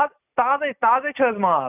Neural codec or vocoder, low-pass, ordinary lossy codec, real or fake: none; 3.6 kHz; none; real